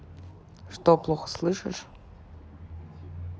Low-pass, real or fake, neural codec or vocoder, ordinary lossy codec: none; real; none; none